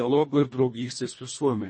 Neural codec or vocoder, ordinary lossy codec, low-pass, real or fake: codec, 24 kHz, 1.5 kbps, HILCodec; MP3, 32 kbps; 9.9 kHz; fake